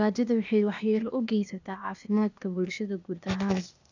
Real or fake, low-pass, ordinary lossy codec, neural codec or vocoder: fake; 7.2 kHz; none; codec, 16 kHz, 0.8 kbps, ZipCodec